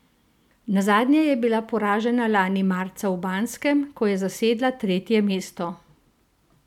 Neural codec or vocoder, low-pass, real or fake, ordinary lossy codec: none; 19.8 kHz; real; none